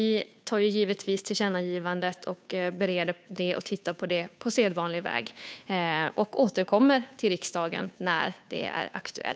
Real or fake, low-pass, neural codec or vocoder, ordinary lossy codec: fake; none; codec, 16 kHz, 2 kbps, FunCodec, trained on Chinese and English, 25 frames a second; none